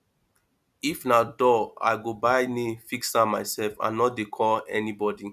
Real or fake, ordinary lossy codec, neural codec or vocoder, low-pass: real; none; none; 14.4 kHz